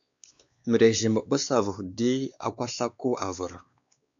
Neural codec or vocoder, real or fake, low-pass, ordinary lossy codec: codec, 16 kHz, 2 kbps, X-Codec, WavLM features, trained on Multilingual LibriSpeech; fake; 7.2 kHz; AAC, 64 kbps